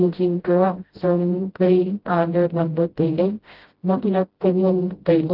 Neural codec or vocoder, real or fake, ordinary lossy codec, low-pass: codec, 16 kHz, 0.5 kbps, FreqCodec, smaller model; fake; Opus, 16 kbps; 5.4 kHz